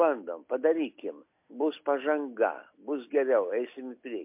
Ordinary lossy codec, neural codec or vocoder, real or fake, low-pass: MP3, 32 kbps; none; real; 3.6 kHz